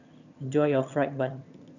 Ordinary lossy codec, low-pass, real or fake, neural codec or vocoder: none; 7.2 kHz; fake; vocoder, 22.05 kHz, 80 mel bands, HiFi-GAN